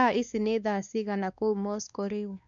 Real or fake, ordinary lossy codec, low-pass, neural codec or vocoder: fake; none; 7.2 kHz; codec, 16 kHz, 2 kbps, X-Codec, WavLM features, trained on Multilingual LibriSpeech